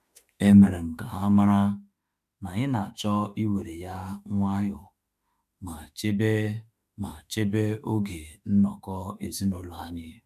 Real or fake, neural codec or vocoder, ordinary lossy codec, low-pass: fake; autoencoder, 48 kHz, 32 numbers a frame, DAC-VAE, trained on Japanese speech; MP3, 96 kbps; 14.4 kHz